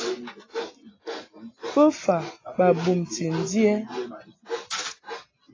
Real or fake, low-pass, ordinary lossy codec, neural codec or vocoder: real; 7.2 kHz; AAC, 32 kbps; none